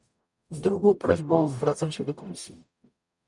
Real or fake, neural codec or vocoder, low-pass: fake; codec, 44.1 kHz, 0.9 kbps, DAC; 10.8 kHz